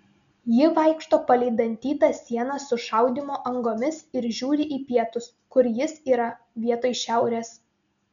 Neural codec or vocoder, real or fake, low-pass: none; real; 7.2 kHz